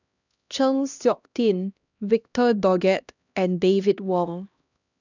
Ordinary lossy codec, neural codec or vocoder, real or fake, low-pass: none; codec, 16 kHz, 1 kbps, X-Codec, HuBERT features, trained on LibriSpeech; fake; 7.2 kHz